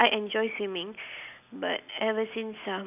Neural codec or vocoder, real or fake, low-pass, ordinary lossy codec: none; real; 3.6 kHz; none